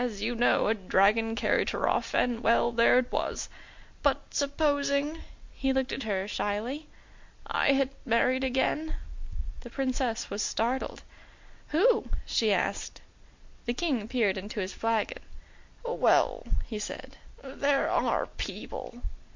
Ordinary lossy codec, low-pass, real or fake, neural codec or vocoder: MP3, 48 kbps; 7.2 kHz; real; none